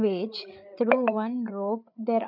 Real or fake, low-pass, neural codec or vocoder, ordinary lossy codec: fake; 5.4 kHz; codec, 16 kHz, 8 kbps, FreqCodec, larger model; none